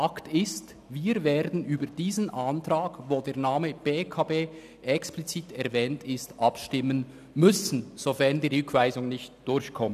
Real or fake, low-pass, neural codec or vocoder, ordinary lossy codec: real; 14.4 kHz; none; none